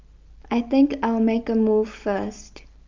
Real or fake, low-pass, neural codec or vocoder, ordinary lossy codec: real; 7.2 kHz; none; Opus, 24 kbps